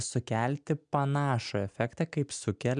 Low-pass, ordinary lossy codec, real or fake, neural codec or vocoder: 9.9 kHz; MP3, 96 kbps; real; none